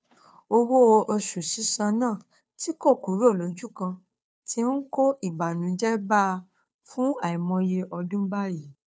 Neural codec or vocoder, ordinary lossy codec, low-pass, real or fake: codec, 16 kHz, 2 kbps, FunCodec, trained on Chinese and English, 25 frames a second; none; none; fake